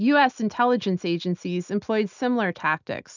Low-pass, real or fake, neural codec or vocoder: 7.2 kHz; real; none